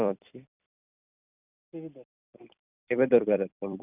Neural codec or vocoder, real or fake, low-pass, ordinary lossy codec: vocoder, 44.1 kHz, 128 mel bands every 256 samples, BigVGAN v2; fake; 3.6 kHz; none